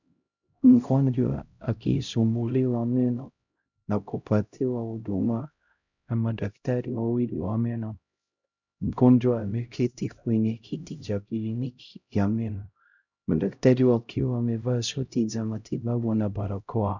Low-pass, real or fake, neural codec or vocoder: 7.2 kHz; fake; codec, 16 kHz, 0.5 kbps, X-Codec, HuBERT features, trained on LibriSpeech